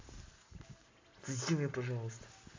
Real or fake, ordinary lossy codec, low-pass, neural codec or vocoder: real; AAC, 32 kbps; 7.2 kHz; none